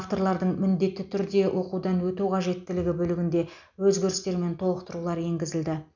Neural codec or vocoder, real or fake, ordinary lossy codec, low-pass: none; real; none; 7.2 kHz